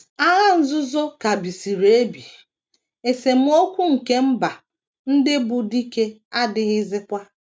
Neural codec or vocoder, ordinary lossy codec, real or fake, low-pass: none; none; real; none